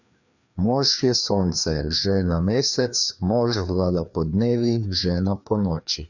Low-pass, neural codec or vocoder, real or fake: 7.2 kHz; codec, 16 kHz, 2 kbps, FreqCodec, larger model; fake